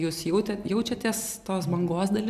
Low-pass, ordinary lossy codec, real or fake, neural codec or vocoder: 14.4 kHz; MP3, 96 kbps; real; none